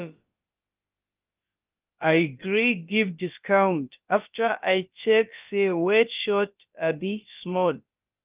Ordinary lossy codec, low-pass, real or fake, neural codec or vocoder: Opus, 24 kbps; 3.6 kHz; fake; codec, 16 kHz, about 1 kbps, DyCAST, with the encoder's durations